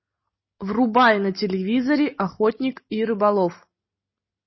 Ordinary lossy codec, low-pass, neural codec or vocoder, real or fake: MP3, 24 kbps; 7.2 kHz; none; real